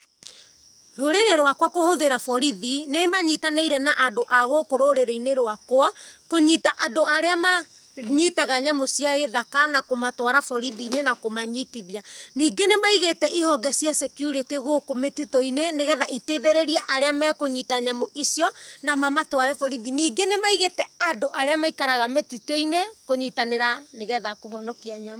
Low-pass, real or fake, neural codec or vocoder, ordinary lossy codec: none; fake; codec, 44.1 kHz, 2.6 kbps, SNAC; none